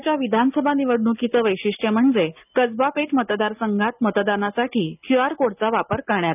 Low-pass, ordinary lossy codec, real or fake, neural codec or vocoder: 3.6 kHz; none; real; none